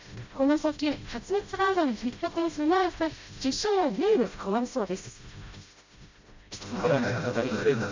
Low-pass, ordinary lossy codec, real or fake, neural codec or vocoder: 7.2 kHz; MP3, 64 kbps; fake; codec, 16 kHz, 0.5 kbps, FreqCodec, smaller model